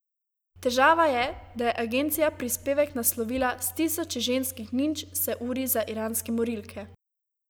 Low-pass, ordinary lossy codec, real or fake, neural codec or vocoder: none; none; real; none